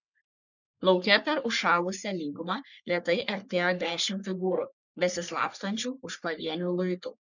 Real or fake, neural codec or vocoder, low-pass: fake; codec, 44.1 kHz, 3.4 kbps, Pupu-Codec; 7.2 kHz